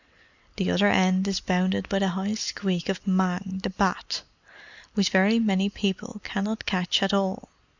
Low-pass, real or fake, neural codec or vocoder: 7.2 kHz; real; none